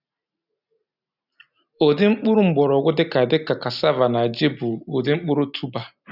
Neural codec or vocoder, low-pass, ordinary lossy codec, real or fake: none; 5.4 kHz; none; real